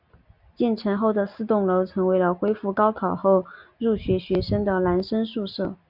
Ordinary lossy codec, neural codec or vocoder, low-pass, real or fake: MP3, 48 kbps; none; 5.4 kHz; real